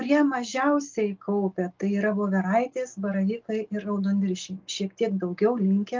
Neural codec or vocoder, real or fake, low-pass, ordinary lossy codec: none; real; 7.2 kHz; Opus, 24 kbps